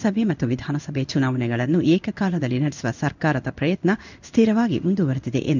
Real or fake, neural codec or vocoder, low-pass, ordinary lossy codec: fake; codec, 16 kHz in and 24 kHz out, 1 kbps, XY-Tokenizer; 7.2 kHz; none